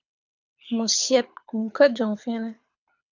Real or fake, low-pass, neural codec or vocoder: fake; 7.2 kHz; codec, 24 kHz, 6 kbps, HILCodec